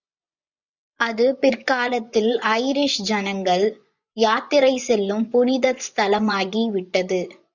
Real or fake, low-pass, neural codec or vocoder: fake; 7.2 kHz; vocoder, 24 kHz, 100 mel bands, Vocos